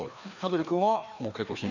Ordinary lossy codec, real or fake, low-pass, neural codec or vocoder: none; fake; 7.2 kHz; codec, 16 kHz, 2 kbps, FreqCodec, larger model